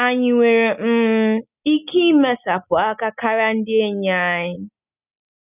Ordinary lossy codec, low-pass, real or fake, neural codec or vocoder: none; 3.6 kHz; real; none